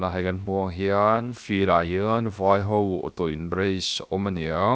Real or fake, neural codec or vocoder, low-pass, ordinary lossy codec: fake; codec, 16 kHz, 0.7 kbps, FocalCodec; none; none